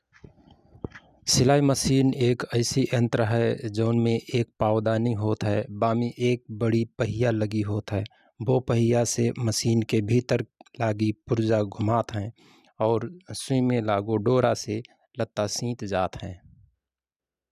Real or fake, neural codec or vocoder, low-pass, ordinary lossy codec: real; none; 14.4 kHz; none